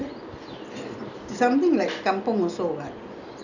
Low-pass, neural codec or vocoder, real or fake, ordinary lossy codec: 7.2 kHz; none; real; none